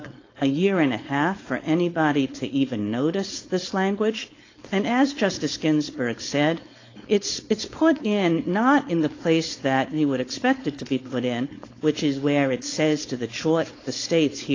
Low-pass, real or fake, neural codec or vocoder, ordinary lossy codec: 7.2 kHz; fake; codec, 16 kHz, 4.8 kbps, FACodec; AAC, 32 kbps